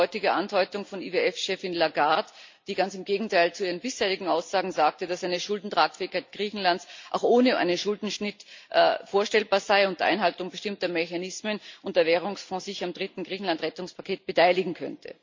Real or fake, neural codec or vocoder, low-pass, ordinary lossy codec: real; none; 7.2 kHz; MP3, 48 kbps